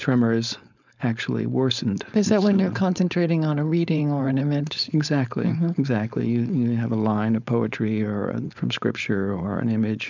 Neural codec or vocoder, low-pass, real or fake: codec, 16 kHz, 4.8 kbps, FACodec; 7.2 kHz; fake